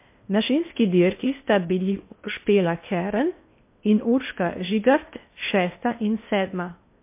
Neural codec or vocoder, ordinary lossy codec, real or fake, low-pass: codec, 16 kHz in and 24 kHz out, 0.8 kbps, FocalCodec, streaming, 65536 codes; MP3, 24 kbps; fake; 3.6 kHz